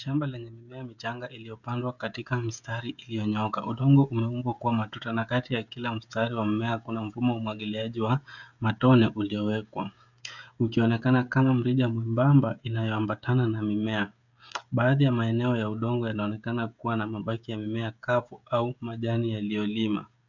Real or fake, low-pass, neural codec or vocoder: fake; 7.2 kHz; codec, 16 kHz, 16 kbps, FreqCodec, smaller model